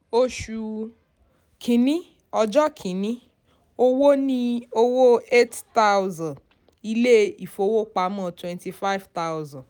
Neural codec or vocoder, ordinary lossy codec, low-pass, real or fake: none; none; none; real